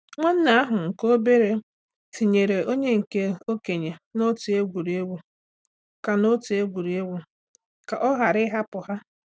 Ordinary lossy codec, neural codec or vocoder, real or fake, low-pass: none; none; real; none